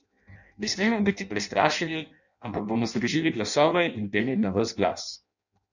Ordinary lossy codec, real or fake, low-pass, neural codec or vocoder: none; fake; 7.2 kHz; codec, 16 kHz in and 24 kHz out, 0.6 kbps, FireRedTTS-2 codec